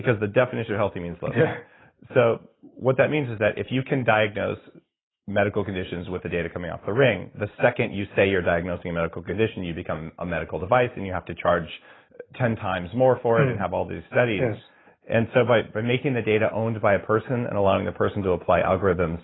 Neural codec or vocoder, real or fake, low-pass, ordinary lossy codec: none; real; 7.2 kHz; AAC, 16 kbps